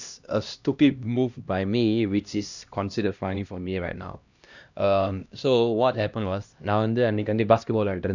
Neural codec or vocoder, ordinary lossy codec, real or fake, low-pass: codec, 16 kHz, 1 kbps, X-Codec, HuBERT features, trained on LibriSpeech; none; fake; 7.2 kHz